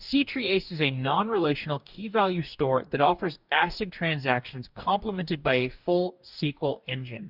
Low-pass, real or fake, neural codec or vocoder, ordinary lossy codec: 5.4 kHz; fake; codec, 44.1 kHz, 2.6 kbps, DAC; Opus, 64 kbps